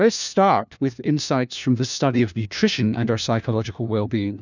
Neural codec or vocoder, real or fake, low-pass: codec, 16 kHz, 1 kbps, FunCodec, trained on LibriTTS, 50 frames a second; fake; 7.2 kHz